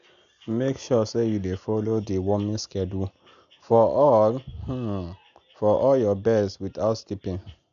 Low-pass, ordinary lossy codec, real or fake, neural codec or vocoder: 7.2 kHz; none; real; none